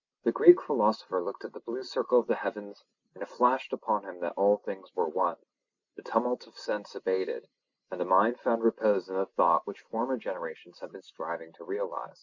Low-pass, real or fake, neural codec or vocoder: 7.2 kHz; real; none